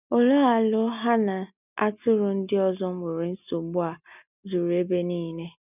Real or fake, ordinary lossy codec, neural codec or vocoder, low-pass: real; none; none; 3.6 kHz